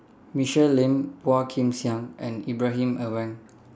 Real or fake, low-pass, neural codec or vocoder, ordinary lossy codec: real; none; none; none